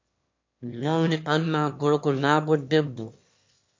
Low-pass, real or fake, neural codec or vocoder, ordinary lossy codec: 7.2 kHz; fake; autoencoder, 22.05 kHz, a latent of 192 numbers a frame, VITS, trained on one speaker; MP3, 48 kbps